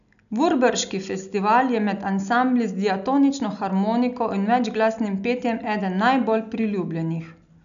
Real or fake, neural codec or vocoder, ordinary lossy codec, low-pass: real; none; none; 7.2 kHz